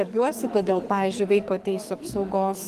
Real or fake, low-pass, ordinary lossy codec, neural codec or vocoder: fake; 14.4 kHz; Opus, 24 kbps; codec, 44.1 kHz, 2.6 kbps, SNAC